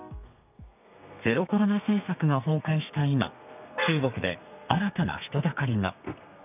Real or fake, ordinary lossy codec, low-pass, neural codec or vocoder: fake; none; 3.6 kHz; codec, 32 kHz, 1.9 kbps, SNAC